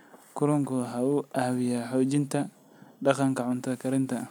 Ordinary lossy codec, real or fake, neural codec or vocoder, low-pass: none; real; none; none